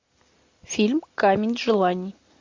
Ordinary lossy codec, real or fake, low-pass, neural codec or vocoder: MP3, 48 kbps; real; 7.2 kHz; none